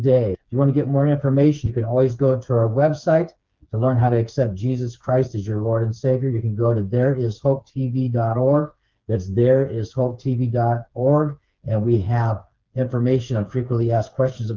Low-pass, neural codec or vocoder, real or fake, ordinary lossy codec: 7.2 kHz; codec, 16 kHz, 4 kbps, FreqCodec, smaller model; fake; Opus, 24 kbps